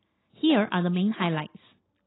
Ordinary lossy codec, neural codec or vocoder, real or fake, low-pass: AAC, 16 kbps; none; real; 7.2 kHz